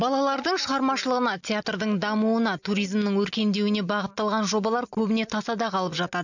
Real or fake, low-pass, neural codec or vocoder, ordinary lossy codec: real; 7.2 kHz; none; none